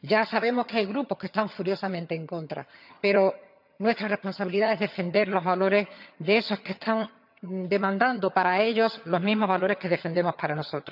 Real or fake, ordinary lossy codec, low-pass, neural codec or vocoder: fake; none; 5.4 kHz; vocoder, 22.05 kHz, 80 mel bands, HiFi-GAN